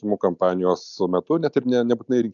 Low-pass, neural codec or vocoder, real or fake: 7.2 kHz; none; real